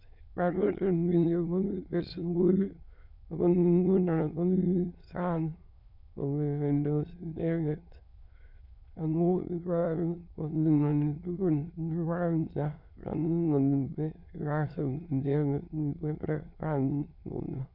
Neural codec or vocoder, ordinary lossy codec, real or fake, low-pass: autoencoder, 22.05 kHz, a latent of 192 numbers a frame, VITS, trained on many speakers; none; fake; 5.4 kHz